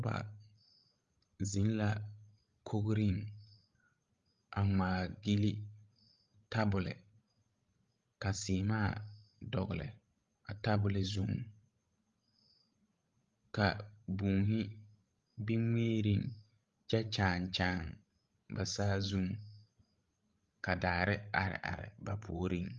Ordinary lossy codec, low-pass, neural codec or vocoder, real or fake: Opus, 32 kbps; 7.2 kHz; codec, 16 kHz, 16 kbps, FreqCodec, larger model; fake